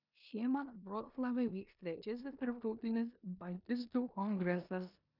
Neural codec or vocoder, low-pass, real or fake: codec, 16 kHz in and 24 kHz out, 0.9 kbps, LongCat-Audio-Codec, four codebook decoder; 5.4 kHz; fake